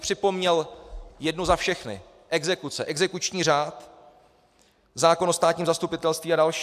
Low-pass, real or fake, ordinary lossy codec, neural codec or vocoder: 14.4 kHz; real; AAC, 96 kbps; none